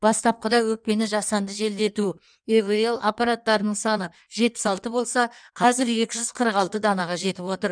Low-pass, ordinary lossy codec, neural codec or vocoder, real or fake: 9.9 kHz; none; codec, 16 kHz in and 24 kHz out, 1.1 kbps, FireRedTTS-2 codec; fake